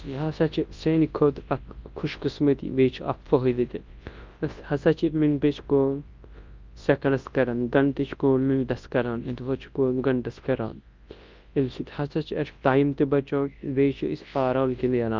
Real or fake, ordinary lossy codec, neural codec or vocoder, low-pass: fake; Opus, 24 kbps; codec, 24 kHz, 0.9 kbps, WavTokenizer, large speech release; 7.2 kHz